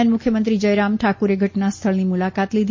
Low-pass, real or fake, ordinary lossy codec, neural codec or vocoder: 7.2 kHz; real; MP3, 32 kbps; none